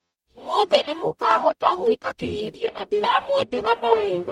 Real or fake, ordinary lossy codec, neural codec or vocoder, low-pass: fake; MP3, 64 kbps; codec, 44.1 kHz, 0.9 kbps, DAC; 19.8 kHz